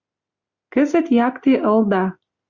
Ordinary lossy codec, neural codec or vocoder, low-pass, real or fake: Opus, 64 kbps; none; 7.2 kHz; real